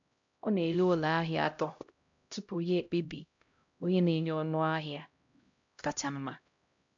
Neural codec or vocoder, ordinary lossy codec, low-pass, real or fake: codec, 16 kHz, 0.5 kbps, X-Codec, HuBERT features, trained on LibriSpeech; MP3, 64 kbps; 7.2 kHz; fake